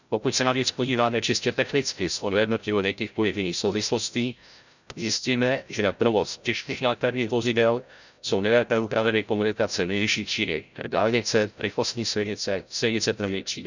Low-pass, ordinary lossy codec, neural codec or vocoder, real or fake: 7.2 kHz; none; codec, 16 kHz, 0.5 kbps, FreqCodec, larger model; fake